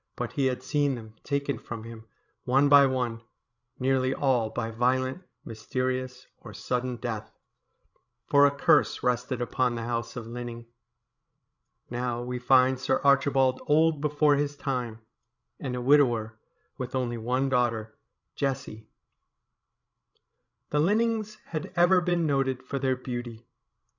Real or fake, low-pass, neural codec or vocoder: fake; 7.2 kHz; codec, 16 kHz, 16 kbps, FreqCodec, larger model